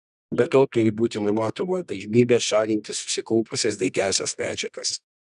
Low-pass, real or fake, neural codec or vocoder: 10.8 kHz; fake; codec, 24 kHz, 0.9 kbps, WavTokenizer, medium music audio release